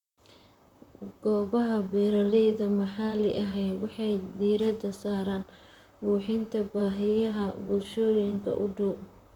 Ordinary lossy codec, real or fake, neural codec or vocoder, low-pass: none; fake; vocoder, 44.1 kHz, 128 mel bands, Pupu-Vocoder; 19.8 kHz